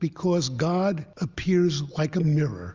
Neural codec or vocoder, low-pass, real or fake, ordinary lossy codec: none; 7.2 kHz; real; Opus, 32 kbps